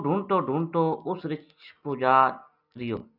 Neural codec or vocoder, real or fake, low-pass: none; real; 5.4 kHz